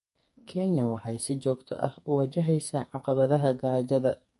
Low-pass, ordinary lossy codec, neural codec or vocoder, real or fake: 14.4 kHz; MP3, 48 kbps; codec, 44.1 kHz, 2.6 kbps, SNAC; fake